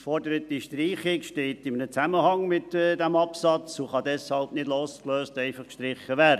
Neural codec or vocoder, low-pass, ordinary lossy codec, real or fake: none; 14.4 kHz; none; real